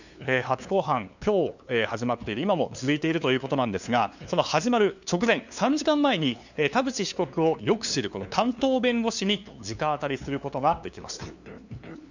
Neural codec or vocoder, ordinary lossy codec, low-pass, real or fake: codec, 16 kHz, 2 kbps, FunCodec, trained on LibriTTS, 25 frames a second; none; 7.2 kHz; fake